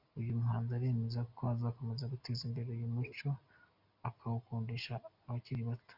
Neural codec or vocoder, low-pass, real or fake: none; 5.4 kHz; real